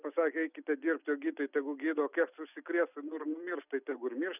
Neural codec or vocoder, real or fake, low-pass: none; real; 3.6 kHz